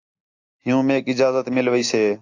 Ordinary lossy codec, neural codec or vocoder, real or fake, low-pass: AAC, 48 kbps; none; real; 7.2 kHz